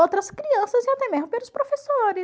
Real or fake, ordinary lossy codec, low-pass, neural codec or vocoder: real; none; none; none